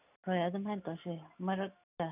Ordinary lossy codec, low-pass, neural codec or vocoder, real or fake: none; 3.6 kHz; none; real